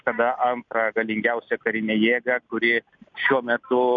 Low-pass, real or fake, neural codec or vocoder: 7.2 kHz; real; none